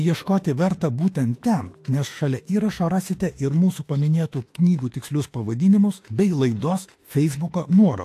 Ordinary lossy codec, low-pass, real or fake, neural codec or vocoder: AAC, 64 kbps; 14.4 kHz; fake; autoencoder, 48 kHz, 32 numbers a frame, DAC-VAE, trained on Japanese speech